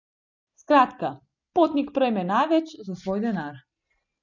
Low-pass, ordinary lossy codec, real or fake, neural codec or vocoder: 7.2 kHz; none; real; none